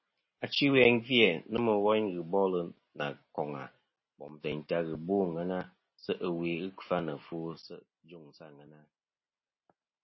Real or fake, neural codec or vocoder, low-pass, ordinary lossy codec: real; none; 7.2 kHz; MP3, 24 kbps